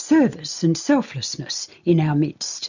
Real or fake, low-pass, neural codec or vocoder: real; 7.2 kHz; none